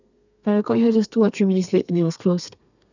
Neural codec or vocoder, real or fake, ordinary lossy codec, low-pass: codec, 32 kHz, 1.9 kbps, SNAC; fake; none; 7.2 kHz